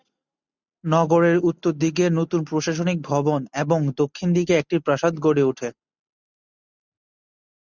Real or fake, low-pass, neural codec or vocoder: real; 7.2 kHz; none